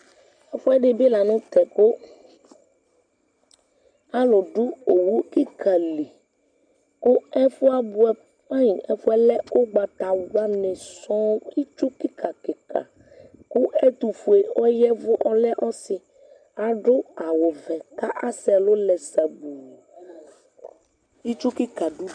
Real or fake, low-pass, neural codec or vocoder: real; 9.9 kHz; none